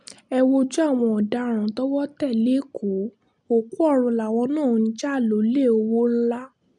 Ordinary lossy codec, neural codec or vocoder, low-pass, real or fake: none; none; 10.8 kHz; real